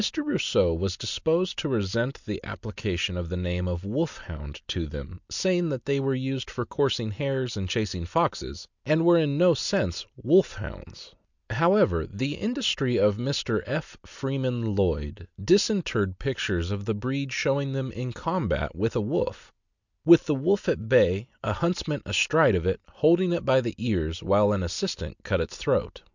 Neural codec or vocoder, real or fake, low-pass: none; real; 7.2 kHz